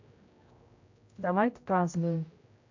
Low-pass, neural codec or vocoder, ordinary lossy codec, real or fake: 7.2 kHz; codec, 16 kHz, 0.5 kbps, X-Codec, HuBERT features, trained on general audio; AAC, 48 kbps; fake